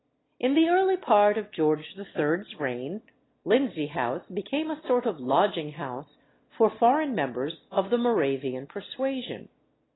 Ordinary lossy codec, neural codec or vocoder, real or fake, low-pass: AAC, 16 kbps; none; real; 7.2 kHz